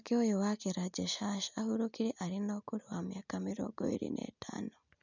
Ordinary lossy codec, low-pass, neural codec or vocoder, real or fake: none; 7.2 kHz; none; real